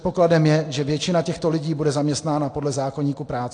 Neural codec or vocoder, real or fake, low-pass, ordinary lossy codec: none; real; 9.9 kHz; AAC, 48 kbps